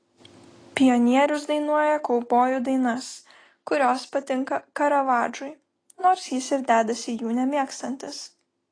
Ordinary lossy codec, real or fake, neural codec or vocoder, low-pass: AAC, 32 kbps; real; none; 9.9 kHz